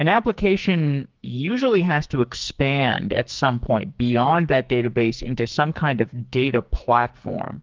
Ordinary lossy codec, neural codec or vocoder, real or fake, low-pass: Opus, 24 kbps; codec, 44.1 kHz, 2.6 kbps, SNAC; fake; 7.2 kHz